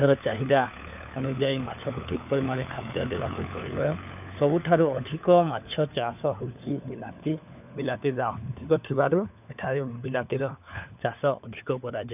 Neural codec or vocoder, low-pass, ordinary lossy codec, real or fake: codec, 16 kHz, 4 kbps, FunCodec, trained on LibriTTS, 50 frames a second; 3.6 kHz; none; fake